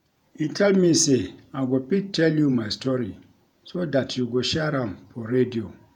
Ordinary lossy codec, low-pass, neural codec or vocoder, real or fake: none; 19.8 kHz; vocoder, 48 kHz, 128 mel bands, Vocos; fake